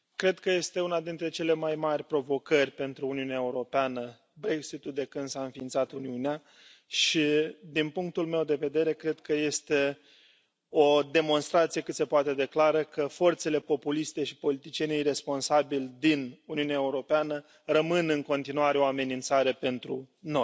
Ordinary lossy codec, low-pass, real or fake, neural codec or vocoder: none; none; real; none